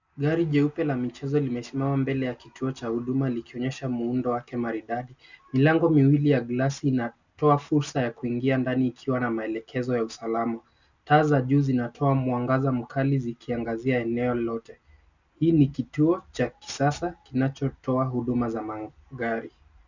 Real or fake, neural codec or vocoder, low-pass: real; none; 7.2 kHz